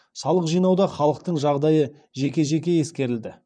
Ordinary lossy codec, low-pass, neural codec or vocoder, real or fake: none; 9.9 kHz; vocoder, 44.1 kHz, 128 mel bands, Pupu-Vocoder; fake